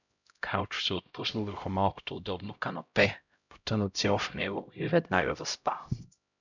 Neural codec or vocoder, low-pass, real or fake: codec, 16 kHz, 0.5 kbps, X-Codec, HuBERT features, trained on LibriSpeech; 7.2 kHz; fake